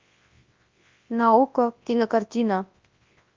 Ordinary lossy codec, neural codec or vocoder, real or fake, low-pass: Opus, 24 kbps; codec, 24 kHz, 0.9 kbps, WavTokenizer, large speech release; fake; 7.2 kHz